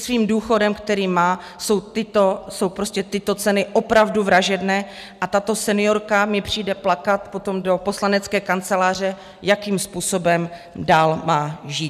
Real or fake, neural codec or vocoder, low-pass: real; none; 14.4 kHz